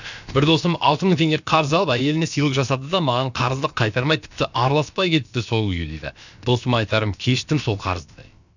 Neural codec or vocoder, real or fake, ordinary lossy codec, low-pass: codec, 16 kHz, about 1 kbps, DyCAST, with the encoder's durations; fake; none; 7.2 kHz